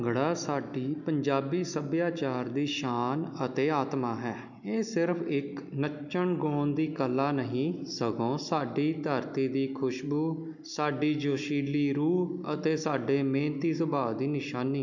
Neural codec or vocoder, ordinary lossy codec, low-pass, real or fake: none; none; 7.2 kHz; real